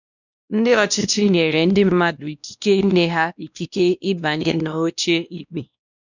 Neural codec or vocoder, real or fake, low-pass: codec, 16 kHz, 1 kbps, X-Codec, WavLM features, trained on Multilingual LibriSpeech; fake; 7.2 kHz